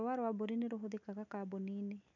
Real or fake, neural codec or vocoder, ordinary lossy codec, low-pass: real; none; none; 7.2 kHz